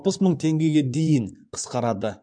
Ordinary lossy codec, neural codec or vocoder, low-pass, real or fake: none; codec, 16 kHz in and 24 kHz out, 2.2 kbps, FireRedTTS-2 codec; 9.9 kHz; fake